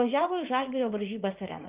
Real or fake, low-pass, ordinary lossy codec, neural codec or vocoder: fake; 3.6 kHz; Opus, 24 kbps; vocoder, 44.1 kHz, 80 mel bands, Vocos